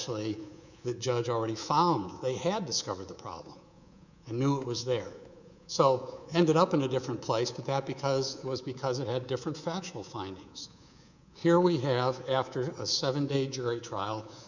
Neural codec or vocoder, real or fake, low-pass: codec, 24 kHz, 3.1 kbps, DualCodec; fake; 7.2 kHz